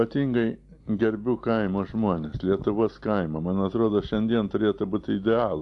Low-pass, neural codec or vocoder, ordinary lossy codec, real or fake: 10.8 kHz; none; AAC, 64 kbps; real